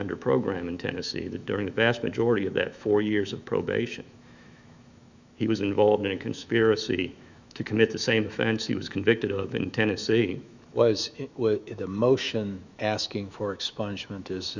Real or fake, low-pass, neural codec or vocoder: fake; 7.2 kHz; autoencoder, 48 kHz, 128 numbers a frame, DAC-VAE, trained on Japanese speech